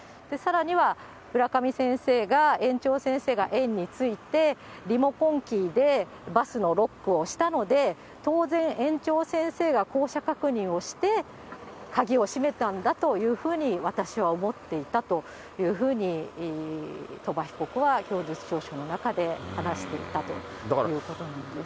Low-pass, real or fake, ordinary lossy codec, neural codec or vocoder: none; real; none; none